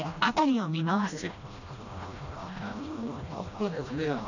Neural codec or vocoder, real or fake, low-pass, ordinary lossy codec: codec, 16 kHz, 1 kbps, FreqCodec, smaller model; fake; 7.2 kHz; none